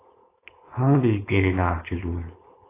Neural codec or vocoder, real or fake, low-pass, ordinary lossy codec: codec, 16 kHz, 4.8 kbps, FACodec; fake; 3.6 kHz; AAC, 16 kbps